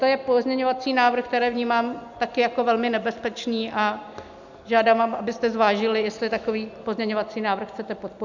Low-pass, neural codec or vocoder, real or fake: 7.2 kHz; none; real